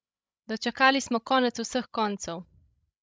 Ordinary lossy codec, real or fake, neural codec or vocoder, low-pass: none; fake; codec, 16 kHz, 16 kbps, FreqCodec, larger model; none